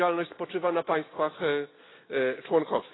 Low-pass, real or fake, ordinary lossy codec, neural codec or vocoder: 7.2 kHz; real; AAC, 16 kbps; none